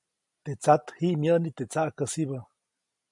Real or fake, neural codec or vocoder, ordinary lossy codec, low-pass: real; none; MP3, 96 kbps; 10.8 kHz